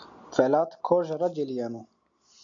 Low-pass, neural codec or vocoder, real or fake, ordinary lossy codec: 7.2 kHz; none; real; MP3, 64 kbps